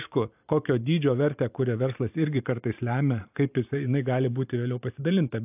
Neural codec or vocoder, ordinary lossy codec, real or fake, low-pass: vocoder, 44.1 kHz, 128 mel bands every 512 samples, BigVGAN v2; AAC, 32 kbps; fake; 3.6 kHz